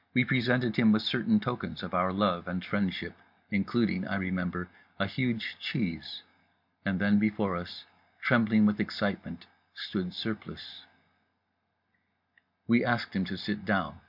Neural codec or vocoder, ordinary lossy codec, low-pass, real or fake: none; MP3, 48 kbps; 5.4 kHz; real